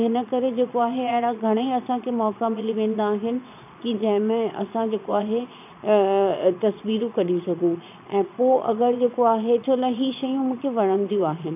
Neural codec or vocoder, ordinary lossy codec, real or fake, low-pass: vocoder, 22.05 kHz, 80 mel bands, Vocos; none; fake; 3.6 kHz